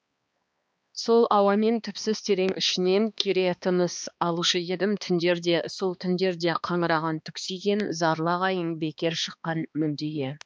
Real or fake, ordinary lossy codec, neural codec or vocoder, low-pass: fake; none; codec, 16 kHz, 2 kbps, X-Codec, HuBERT features, trained on balanced general audio; none